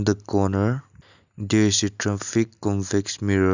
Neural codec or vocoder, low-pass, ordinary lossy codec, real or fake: none; 7.2 kHz; none; real